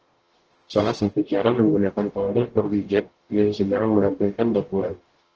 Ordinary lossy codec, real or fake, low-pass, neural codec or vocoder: Opus, 16 kbps; fake; 7.2 kHz; codec, 44.1 kHz, 0.9 kbps, DAC